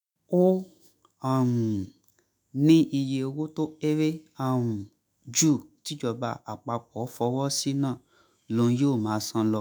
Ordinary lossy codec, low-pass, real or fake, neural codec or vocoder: none; none; fake; autoencoder, 48 kHz, 128 numbers a frame, DAC-VAE, trained on Japanese speech